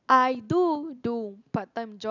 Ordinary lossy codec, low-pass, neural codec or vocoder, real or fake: none; 7.2 kHz; none; real